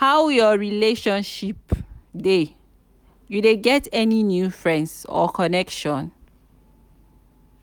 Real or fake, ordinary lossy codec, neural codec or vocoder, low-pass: real; none; none; none